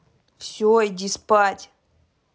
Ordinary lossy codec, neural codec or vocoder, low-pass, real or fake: none; none; none; real